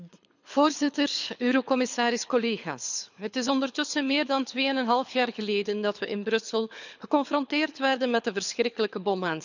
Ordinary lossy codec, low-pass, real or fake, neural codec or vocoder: none; 7.2 kHz; fake; codec, 24 kHz, 6 kbps, HILCodec